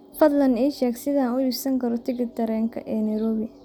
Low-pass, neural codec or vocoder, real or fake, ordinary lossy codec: 19.8 kHz; none; real; none